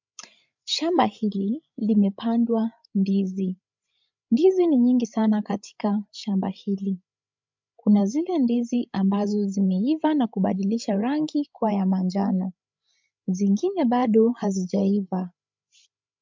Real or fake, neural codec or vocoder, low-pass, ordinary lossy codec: fake; codec, 16 kHz, 8 kbps, FreqCodec, larger model; 7.2 kHz; MP3, 64 kbps